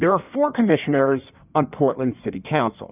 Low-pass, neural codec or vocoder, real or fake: 3.6 kHz; codec, 16 kHz in and 24 kHz out, 1.1 kbps, FireRedTTS-2 codec; fake